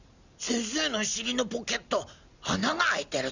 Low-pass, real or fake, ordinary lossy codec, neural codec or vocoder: 7.2 kHz; real; none; none